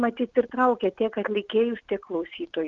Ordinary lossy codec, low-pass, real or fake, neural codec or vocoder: Opus, 24 kbps; 7.2 kHz; real; none